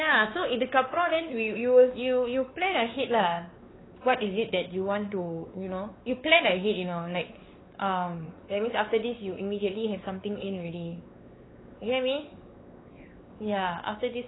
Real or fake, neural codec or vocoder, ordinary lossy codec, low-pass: fake; codec, 16 kHz, 4 kbps, X-Codec, WavLM features, trained on Multilingual LibriSpeech; AAC, 16 kbps; 7.2 kHz